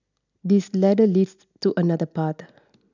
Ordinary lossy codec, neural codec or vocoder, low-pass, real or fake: none; none; 7.2 kHz; real